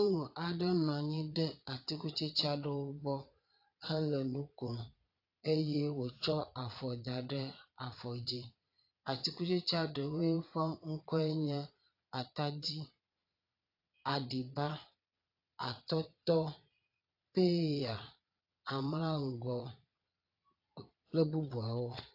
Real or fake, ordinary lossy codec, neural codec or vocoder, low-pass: fake; AAC, 32 kbps; vocoder, 22.05 kHz, 80 mel bands, Vocos; 5.4 kHz